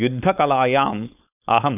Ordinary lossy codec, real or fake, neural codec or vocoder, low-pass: none; fake; codec, 16 kHz, 4.8 kbps, FACodec; 3.6 kHz